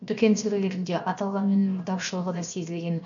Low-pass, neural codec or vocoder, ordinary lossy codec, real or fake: 7.2 kHz; codec, 16 kHz, 0.7 kbps, FocalCodec; none; fake